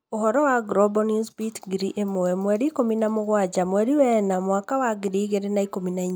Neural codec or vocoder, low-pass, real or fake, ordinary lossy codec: none; none; real; none